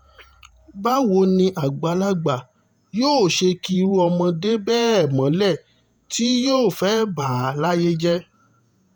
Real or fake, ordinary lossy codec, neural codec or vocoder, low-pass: fake; none; vocoder, 48 kHz, 128 mel bands, Vocos; none